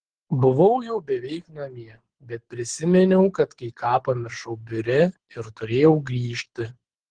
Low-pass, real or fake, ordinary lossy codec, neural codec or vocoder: 9.9 kHz; fake; Opus, 16 kbps; codec, 24 kHz, 6 kbps, HILCodec